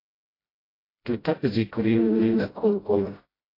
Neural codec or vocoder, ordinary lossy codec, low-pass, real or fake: codec, 16 kHz, 0.5 kbps, FreqCodec, smaller model; AAC, 24 kbps; 5.4 kHz; fake